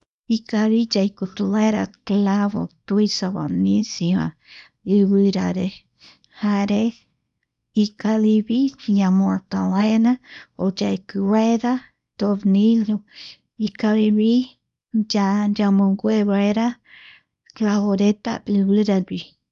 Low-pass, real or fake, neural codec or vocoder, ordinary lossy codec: 10.8 kHz; fake; codec, 24 kHz, 0.9 kbps, WavTokenizer, small release; none